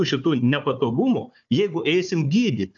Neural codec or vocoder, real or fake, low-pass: codec, 16 kHz, 4 kbps, FunCodec, trained on Chinese and English, 50 frames a second; fake; 7.2 kHz